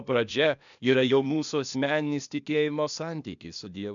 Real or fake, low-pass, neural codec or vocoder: fake; 7.2 kHz; codec, 16 kHz, 0.8 kbps, ZipCodec